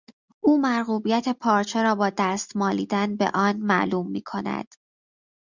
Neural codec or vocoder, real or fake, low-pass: none; real; 7.2 kHz